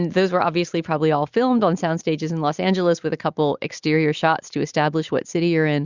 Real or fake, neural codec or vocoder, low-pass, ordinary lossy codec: real; none; 7.2 kHz; Opus, 64 kbps